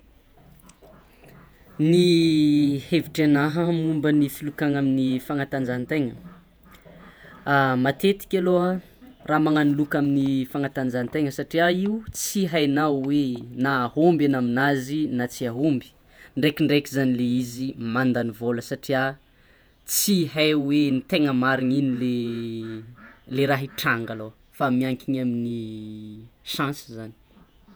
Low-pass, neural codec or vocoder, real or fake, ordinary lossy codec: none; vocoder, 48 kHz, 128 mel bands, Vocos; fake; none